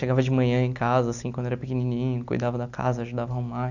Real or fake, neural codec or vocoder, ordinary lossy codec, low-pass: real; none; none; 7.2 kHz